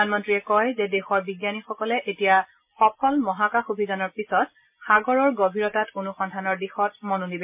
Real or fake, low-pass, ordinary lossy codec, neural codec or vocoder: real; 3.6 kHz; MP3, 32 kbps; none